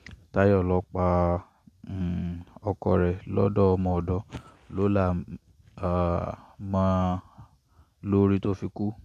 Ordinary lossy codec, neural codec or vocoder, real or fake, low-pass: MP3, 64 kbps; none; real; 14.4 kHz